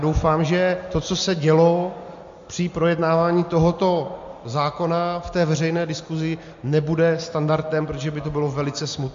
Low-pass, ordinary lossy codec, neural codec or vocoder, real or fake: 7.2 kHz; MP3, 48 kbps; none; real